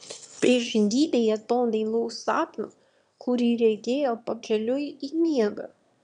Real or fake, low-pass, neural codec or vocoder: fake; 9.9 kHz; autoencoder, 22.05 kHz, a latent of 192 numbers a frame, VITS, trained on one speaker